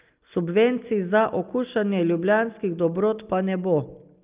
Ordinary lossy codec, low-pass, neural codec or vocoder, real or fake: Opus, 24 kbps; 3.6 kHz; none; real